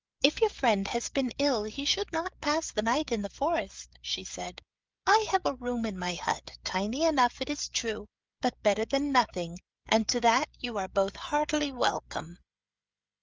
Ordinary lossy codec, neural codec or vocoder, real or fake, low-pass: Opus, 24 kbps; codec, 16 kHz, 16 kbps, FreqCodec, smaller model; fake; 7.2 kHz